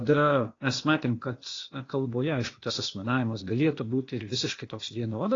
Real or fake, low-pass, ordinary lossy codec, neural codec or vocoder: fake; 7.2 kHz; AAC, 32 kbps; codec, 16 kHz, 0.8 kbps, ZipCodec